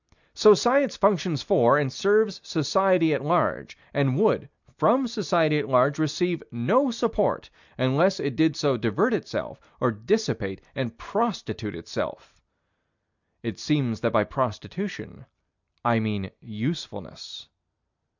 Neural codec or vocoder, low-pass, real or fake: none; 7.2 kHz; real